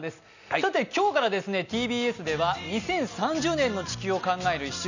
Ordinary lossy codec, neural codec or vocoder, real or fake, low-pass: none; none; real; 7.2 kHz